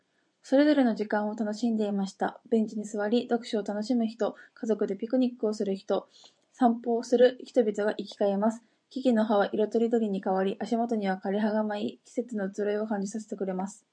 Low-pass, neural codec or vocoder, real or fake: 9.9 kHz; vocoder, 24 kHz, 100 mel bands, Vocos; fake